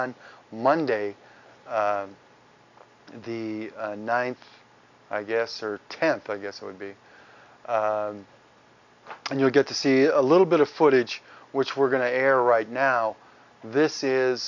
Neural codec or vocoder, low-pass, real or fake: none; 7.2 kHz; real